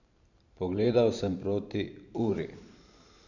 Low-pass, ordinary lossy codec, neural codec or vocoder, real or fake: 7.2 kHz; none; vocoder, 44.1 kHz, 128 mel bands every 512 samples, BigVGAN v2; fake